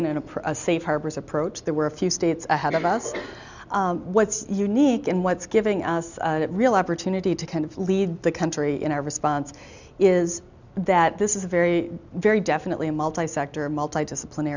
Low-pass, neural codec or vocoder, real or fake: 7.2 kHz; none; real